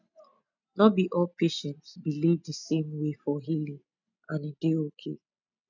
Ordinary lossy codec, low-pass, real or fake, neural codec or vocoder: none; 7.2 kHz; real; none